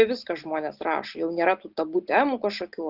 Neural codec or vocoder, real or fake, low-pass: none; real; 5.4 kHz